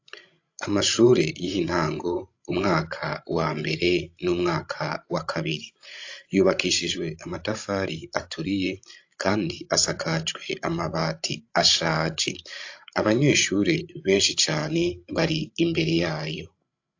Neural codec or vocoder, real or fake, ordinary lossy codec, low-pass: codec, 16 kHz, 16 kbps, FreqCodec, larger model; fake; AAC, 48 kbps; 7.2 kHz